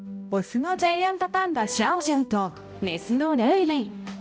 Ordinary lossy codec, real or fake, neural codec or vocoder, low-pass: none; fake; codec, 16 kHz, 0.5 kbps, X-Codec, HuBERT features, trained on balanced general audio; none